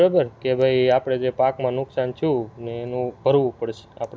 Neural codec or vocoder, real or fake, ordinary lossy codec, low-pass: none; real; none; none